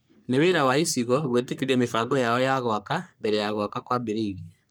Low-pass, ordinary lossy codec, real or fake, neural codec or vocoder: none; none; fake; codec, 44.1 kHz, 3.4 kbps, Pupu-Codec